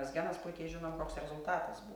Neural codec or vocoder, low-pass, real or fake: none; 19.8 kHz; real